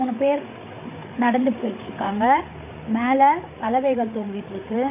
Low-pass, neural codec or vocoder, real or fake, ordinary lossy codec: 3.6 kHz; codec, 16 kHz, 8 kbps, FreqCodec, larger model; fake; MP3, 24 kbps